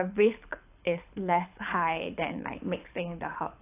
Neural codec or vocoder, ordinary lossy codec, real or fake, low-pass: codec, 24 kHz, 6 kbps, HILCodec; AAC, 24 kbps; fake; 3.6 kHz